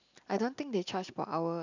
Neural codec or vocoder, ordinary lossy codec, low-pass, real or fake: none; none; 7.2 kHz; real